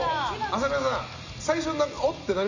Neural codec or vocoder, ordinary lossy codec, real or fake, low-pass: none; none; real; 7.2 kHz